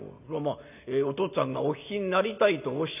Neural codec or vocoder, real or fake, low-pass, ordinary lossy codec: none; real; 3.6 kHz; none